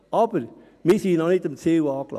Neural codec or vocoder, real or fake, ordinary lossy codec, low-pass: none; real; none; 14.4 kHz